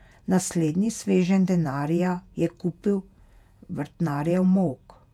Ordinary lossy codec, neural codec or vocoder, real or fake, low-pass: none; vocoder, 48 kHz, 128 mel bands, Vocos; fake; 19.8 kHz